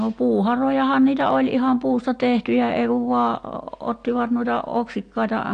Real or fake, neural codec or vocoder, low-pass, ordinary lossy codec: real; none; 9.9 kHz; AAC, 48 kbps